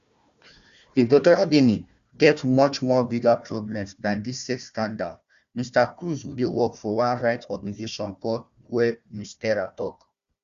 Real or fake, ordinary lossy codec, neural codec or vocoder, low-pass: fake; Opus, 64 kbps; codec, 16 kHz, 1 kbps, FunCodec, trained on Chinese and English, 50 frames a second; 7.2 kHz